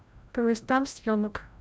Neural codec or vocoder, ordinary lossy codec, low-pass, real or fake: codec, 16 kHz, 0.5 kbps, FreqCodec, larger model; none; none; fake